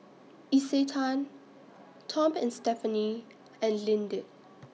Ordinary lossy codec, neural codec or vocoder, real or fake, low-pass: none; none; real; none